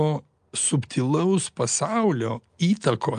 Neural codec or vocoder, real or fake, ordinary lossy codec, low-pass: none; real; Opus, 32 kbps; 9.9 kHz